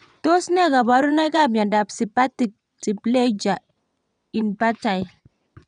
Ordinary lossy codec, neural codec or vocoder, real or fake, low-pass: none; vocoder, 22.05 kHz, 80 mel bands, WaveNeXt; fake; 9.9 kHz